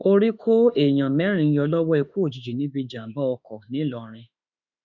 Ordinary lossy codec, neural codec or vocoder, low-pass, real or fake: none; autoencoder, 48 kHz, 32 numbers a frame, DAC-VAE, trained on Japanese speech; 7.2 kHz; fake